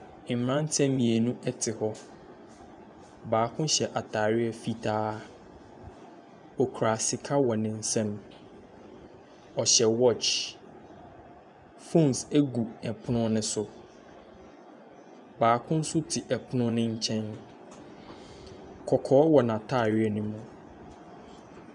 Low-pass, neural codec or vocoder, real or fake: 10.8 kHz; vocoder, 24 kHz, 100 mel bands, Vocos; fake